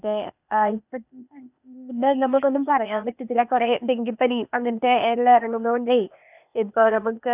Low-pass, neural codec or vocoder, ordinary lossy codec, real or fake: 3.6 kHz; codec, 16 kHz, 0.8 kbps, ZipCodec; none; fake